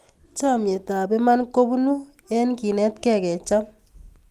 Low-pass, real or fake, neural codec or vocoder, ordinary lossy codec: 14.4 kHz; real; none; Opus, 32 kbps